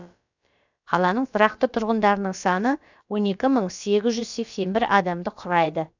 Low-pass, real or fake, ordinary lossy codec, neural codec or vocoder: 7.2 kHz; fake; none; codec, 16 kHz, about 1 kbps, DyCAST, with the encoder's durations